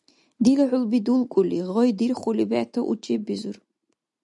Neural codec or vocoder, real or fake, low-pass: none; real; 10.8 kHz